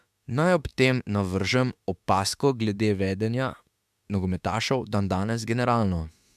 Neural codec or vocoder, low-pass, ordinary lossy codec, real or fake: autoencoder, 48 kHz, 32 numbers a frame, DAC-VAE, trained on Japanese speech; 14.4 kHz; MP3, 96 kbps; fake